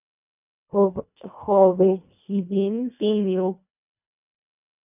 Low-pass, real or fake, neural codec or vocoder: 3.6 kHz; fake; codec, 24 kHz, 1.5 kbps, HILCodec